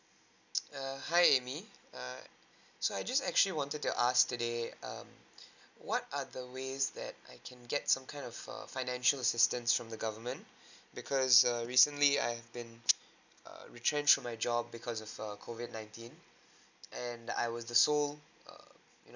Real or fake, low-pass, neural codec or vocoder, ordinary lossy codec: real; 7.2 kHz; none; none